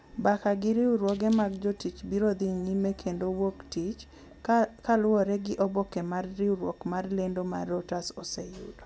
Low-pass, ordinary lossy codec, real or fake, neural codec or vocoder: none; none; real; none